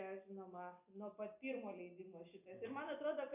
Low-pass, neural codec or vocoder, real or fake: 3.6 kHz; none; real